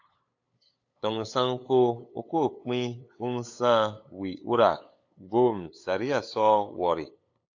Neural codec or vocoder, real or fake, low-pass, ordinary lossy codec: codec, 16 kHz, 8 kbps, FunCodec, trained on LibriTTS, 25 frames a second; fake; 7.2 kHz; AAC, 48 kbps